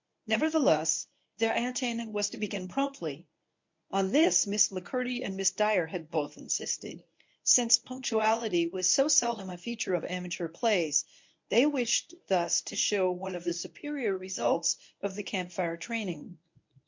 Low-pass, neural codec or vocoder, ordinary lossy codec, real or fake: 7.2 kHz; codec, 24 kHz, 0.9 kbps, WavTokenizer, medium speech release version 1; MP3, 48 kbps; fake